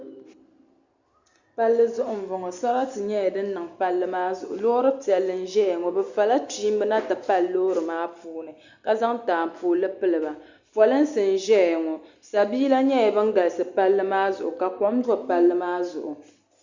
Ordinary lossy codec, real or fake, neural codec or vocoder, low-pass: Opus, 64 kbps; real; none; 7.2 kHz